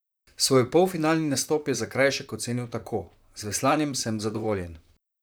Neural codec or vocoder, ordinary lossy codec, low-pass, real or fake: vocoder, 44.1 kHz, 128 mel bands, Pupu-Vocoder; none; none; fake